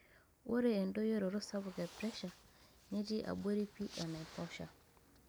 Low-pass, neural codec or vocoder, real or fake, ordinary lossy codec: none; none; real; none